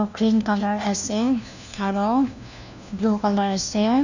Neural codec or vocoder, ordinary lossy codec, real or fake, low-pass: codec, 16 kHz, 1 kbps, FunCodec, trained on LibriTTS, 50 frames a second; none; fake; 7.2 kHz